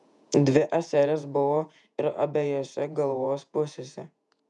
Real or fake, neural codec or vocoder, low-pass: fake; vocoder, 48 kHz, 128 mel bands, Vocos; 10.8 kHz